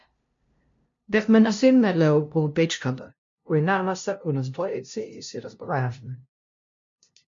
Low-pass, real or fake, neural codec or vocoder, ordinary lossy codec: 7.2 kHz; fake; codec, 16 kHz, 0.5 kbps, FunCodec, trained on LibriTTS, 25 frames a second; MP3, 48 kbps